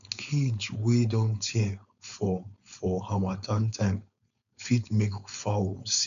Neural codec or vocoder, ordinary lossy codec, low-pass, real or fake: codec, 16 kHz, 4.8 kbps, FACodec; none; 7.2 kHz; fake